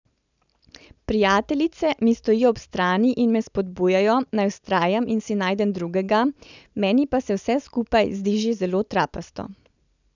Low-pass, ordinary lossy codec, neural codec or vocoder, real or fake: 7.2 kHz; none; none; real